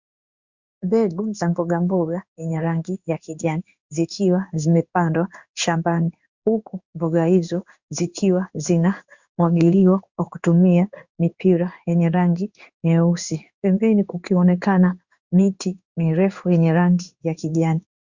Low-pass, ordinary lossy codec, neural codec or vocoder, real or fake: 7.2 kHz; Opus, 64 kbps; codec, 16 kHz in and 24 kHz out, 1 kbps, XY-Tokenizer; fake